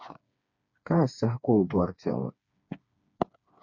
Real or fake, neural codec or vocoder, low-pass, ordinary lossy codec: fake; codec, 16 kHz, 4 kbps, FreqCodec, smaller model; 7.2 kHz; MP3, 64 kbps